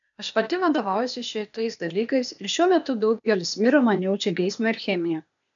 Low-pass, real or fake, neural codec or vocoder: 7.2 kHz; fake; codec, 16 kHz, 0.8 kbps, ZipCodec